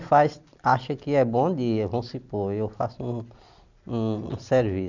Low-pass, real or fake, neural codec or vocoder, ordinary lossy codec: 7.2 kHz; real; none; none